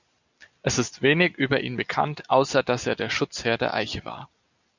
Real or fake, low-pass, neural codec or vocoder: real; 7.2 kHz; none